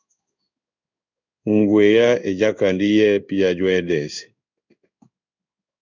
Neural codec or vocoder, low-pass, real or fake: codec, 16 kHz in and 24 kHz out, 1 kbps, XY-Tokenizer; 7.2 kHz; fake